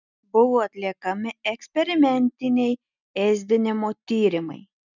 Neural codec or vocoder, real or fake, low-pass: none; real; 7.2 kHz